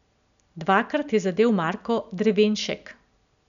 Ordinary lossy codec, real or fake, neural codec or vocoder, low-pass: none; real; none; 7.2 kHz